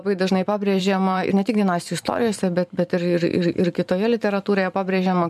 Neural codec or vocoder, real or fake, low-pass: vocoder, 44.1 kHz, 128 mel bands every 512 samples, BigVGAN v2; fake; 14.4 kHz